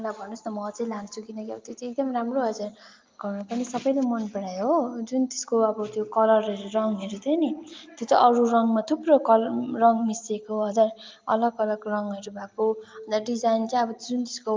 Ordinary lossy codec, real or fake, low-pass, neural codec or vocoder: Opus, 24 kbps; real; 7.2 kHz; none